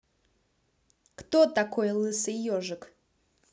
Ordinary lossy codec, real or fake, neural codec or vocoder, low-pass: none; real; none; none